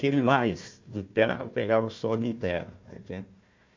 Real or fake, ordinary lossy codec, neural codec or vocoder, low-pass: fake; MP3, 48 kbps; codec, 16 kHz, 1 kbps, FunCodec, trained on Chinese and English, 50 frames a second; 7.2 kHz